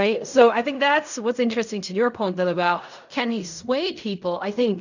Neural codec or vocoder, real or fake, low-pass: codec, 16 kHz in and 24 kHz out, 0.4 kbps, LongCat-Audio-Codec, fine tuned four codebook decoder; fake; 7.2 kHz